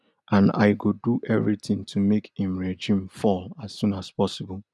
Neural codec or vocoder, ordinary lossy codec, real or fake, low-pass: none; none; real; none